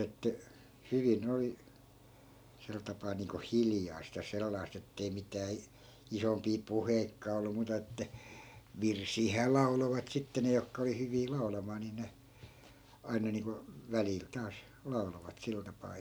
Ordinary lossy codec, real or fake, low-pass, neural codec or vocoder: none; real; none; none